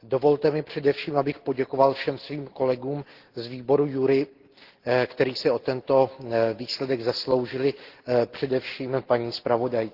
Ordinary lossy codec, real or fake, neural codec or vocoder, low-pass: Opus, 16 kbps; real; none; 5.4 kHz